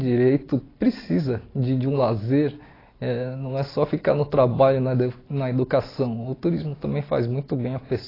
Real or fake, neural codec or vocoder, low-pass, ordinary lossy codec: real; none; 5.4 kHz; AAC, 24 kbps